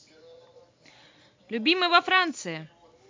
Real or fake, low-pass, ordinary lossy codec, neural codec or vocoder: real; 7.2 kHz; MP3, 64 kbps; none